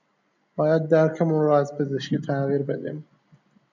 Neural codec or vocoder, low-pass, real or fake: none; 7.2 kHz; real